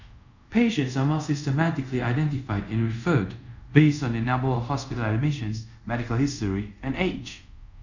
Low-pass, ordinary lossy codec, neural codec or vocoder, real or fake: 7.2 kHz; none; codec, 24 kHz, 0.5 kbps, DualCodec; fake